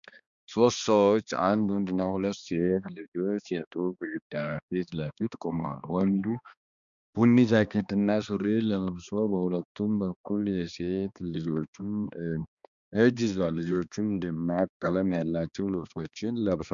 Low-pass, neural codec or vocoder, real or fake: 7.2 kHz; codec, 16 kHz, 2 kbps, X-Codec, HuBERT features, trained on balanced general audio; fake